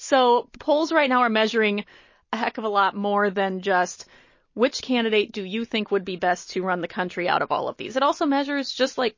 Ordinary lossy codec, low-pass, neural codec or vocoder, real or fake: MP3, 32 kbps; 7.2 kHz; none; real